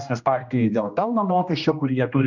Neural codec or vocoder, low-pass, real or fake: codec, 16 kHz, 1 kbps, X-Codec, HuBERT features, trained on general audio; 7.2 kHz; fake